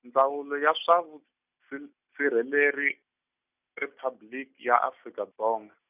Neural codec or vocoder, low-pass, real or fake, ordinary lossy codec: none; 3.6 kHz; real; none